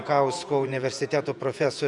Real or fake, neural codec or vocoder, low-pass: fake; vocoder, 48 kHz, 128 mel bands, Vocos; 10.8 kHz